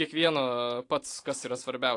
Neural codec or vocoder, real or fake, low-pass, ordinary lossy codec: vocoder, 44.1 kHz, 128 mel bands every 512 samples, BigVGAN v2; fake; 10.8 kHz; AAC, 48 kbps